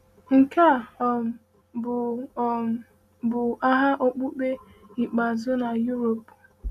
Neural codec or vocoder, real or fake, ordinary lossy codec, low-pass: none; real; none; 14.4 kHz